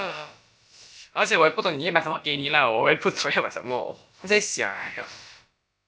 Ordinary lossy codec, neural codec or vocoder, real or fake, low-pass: none; codec, 16 kHz, about 1 kbps, DyCAST, with the encoder's durations; fake; none